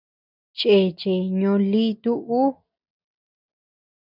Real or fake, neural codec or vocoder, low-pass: real; none; 5.4 kHz